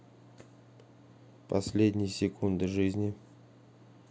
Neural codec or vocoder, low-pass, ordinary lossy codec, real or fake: none; none; none; real